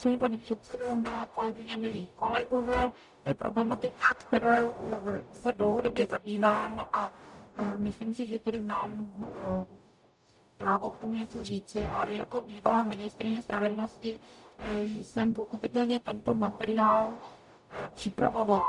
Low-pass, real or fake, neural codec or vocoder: 10.8 kHz; fake; codec, 44.1 kHz, 0.9 kbps, DAC